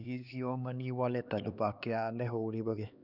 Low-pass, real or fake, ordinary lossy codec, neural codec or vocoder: 5.4 kHz; fake; none; codec, 16 kHz, 2 kbps, X-Codec, HuBERT features, trained on LibriSpeech